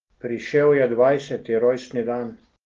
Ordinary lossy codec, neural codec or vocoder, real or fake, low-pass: Opus, 16 kbps; none; real; 7.2 kHz